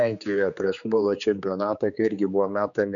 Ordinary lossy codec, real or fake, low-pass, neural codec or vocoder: MP3, 96 kbps; fake; 7.2 kHz; codec, 16 kHz, 4 kbps, X-Codec, HuBERT features, trained on general audio